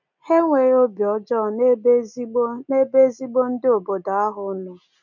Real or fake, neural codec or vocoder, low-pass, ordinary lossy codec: real; none; 7.2 kHz; none